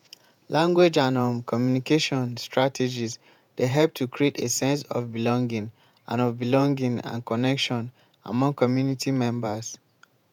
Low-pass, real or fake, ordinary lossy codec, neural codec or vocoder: 19.8 kHz; fake; none; vocoder, 48 kHz, 128 mel bands, Vocos